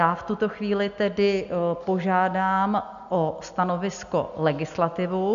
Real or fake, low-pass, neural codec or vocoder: real; 7.2 kHz; none